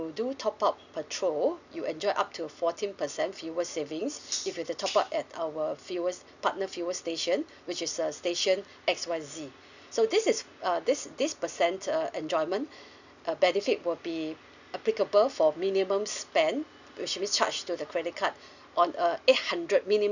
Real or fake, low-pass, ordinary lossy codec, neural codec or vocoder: real; 7.2 kHz; none; none